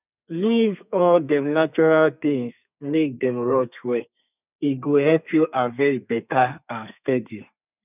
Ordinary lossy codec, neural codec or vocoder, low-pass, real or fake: none; codec, 32 kHz, 1.9 kbps, SNAC; 3.6 kHz; fake